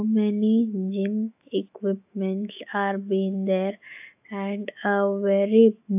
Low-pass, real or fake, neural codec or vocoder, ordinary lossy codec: 3.6 kHz; fake; autoencoder, 48 kHz, 128 numbers a frame, DAC-VAE, trained on Japanese speech; AAC, 32 kbps